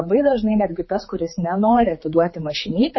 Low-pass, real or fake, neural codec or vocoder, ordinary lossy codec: 7.2 kHz; fake; codec, 16 kHz, 4 kbps, X-Codec, HuBERT features, trained on general audio; MP3, 24 kbps